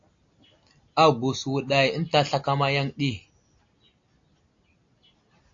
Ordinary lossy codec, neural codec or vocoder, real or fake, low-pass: AAC, 48 kbps; none; real; 7.2 kHz